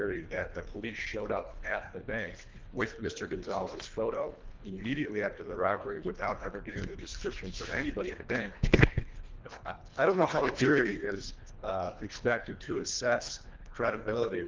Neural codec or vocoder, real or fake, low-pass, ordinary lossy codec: codec, 24 kHz, 1.5 kbps, HILCodec; fake; 7.2 kHz; Opus, 32 kbps